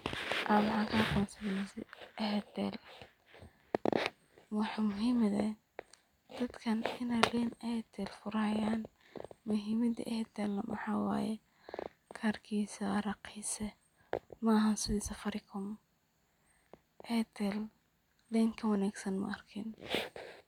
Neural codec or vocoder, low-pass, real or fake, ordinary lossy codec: none; 19.8 kHz; real; none